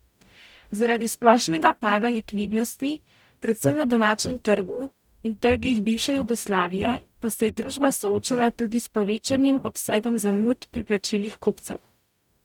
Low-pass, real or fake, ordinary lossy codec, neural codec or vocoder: 19.8 kHz; fake; none; codec, 44.1 kHz, 0.9 kbps, DAC